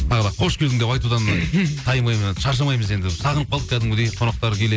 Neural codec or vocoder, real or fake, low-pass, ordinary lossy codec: none; real; none; none